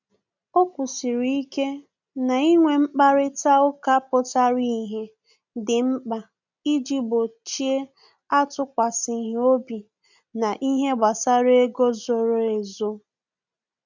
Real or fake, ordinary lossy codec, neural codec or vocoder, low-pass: real; none; none; 7.2 kHz